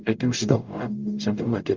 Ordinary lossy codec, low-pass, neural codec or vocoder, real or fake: Opus, 24 kbps; 7.2 kHz; codec, 44.1 kHz, 0.9 kbps, DAC; fake